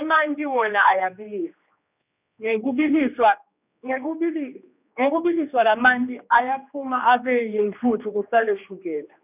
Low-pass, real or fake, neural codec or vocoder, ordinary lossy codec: 3.6 kHz; fake; codec, 16 kHz, 2 kbps, X-Codec, HuBERT features, trained on general audio; none